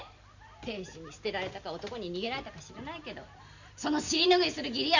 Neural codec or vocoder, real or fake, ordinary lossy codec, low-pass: none; real; Opus, 64 kbps; 7.2 kHz